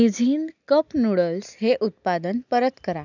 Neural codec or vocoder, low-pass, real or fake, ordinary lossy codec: none; 7.2 kHz; real; none